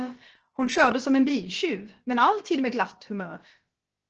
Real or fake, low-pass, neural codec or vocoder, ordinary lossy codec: fake; 7.2 kHz; codec, 16 kHz, about 1 kbps, DyCAST, with the encoder's durations; Opus, 16 kbps